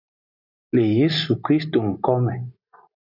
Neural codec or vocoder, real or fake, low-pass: none; real; 5.4 kHz